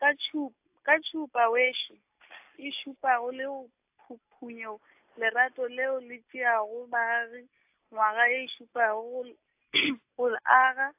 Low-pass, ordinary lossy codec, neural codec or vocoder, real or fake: 3.6 kHz; AAC, 32 kbps; none; real